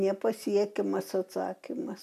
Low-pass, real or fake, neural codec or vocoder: 14.4 kHz; real; none